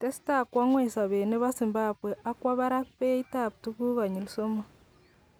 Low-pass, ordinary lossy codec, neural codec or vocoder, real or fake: none; none; none; real